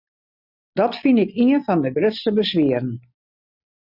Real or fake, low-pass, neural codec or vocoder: real; 5.4 kHz; none